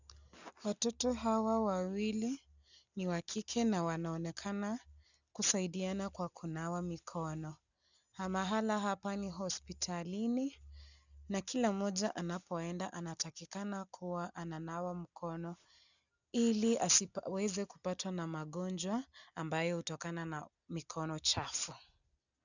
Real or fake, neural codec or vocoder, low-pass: real; none; 7.2 kHz